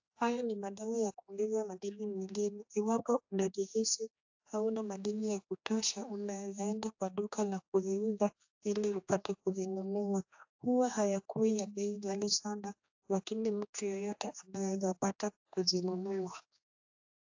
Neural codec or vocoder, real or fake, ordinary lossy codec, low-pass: codec, 16 kHz, 2 kbps, X-Codec, HuBERT features, trained on general audio; fake; AAC, 48 kbps; 7.2 kHz